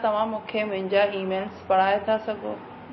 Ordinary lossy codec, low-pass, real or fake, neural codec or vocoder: MP3, 24 kbps; 7.2 kHz; real; none